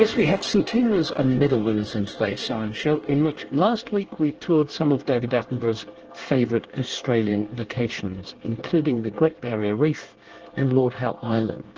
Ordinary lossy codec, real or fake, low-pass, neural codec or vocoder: Opus, 16 kbps; fake; 7.2 kHz; codec, 24 kHz, 1 kbps, SNAC